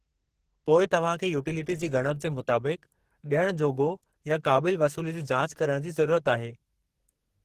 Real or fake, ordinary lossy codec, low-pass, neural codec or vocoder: fake; Opus, 16 kbps; 14.4 kHz; codec, 44.1 kHz, 2.6 kbps, SNAC